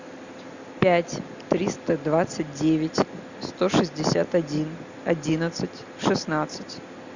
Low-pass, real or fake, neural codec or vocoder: 7.2 kHz; real; none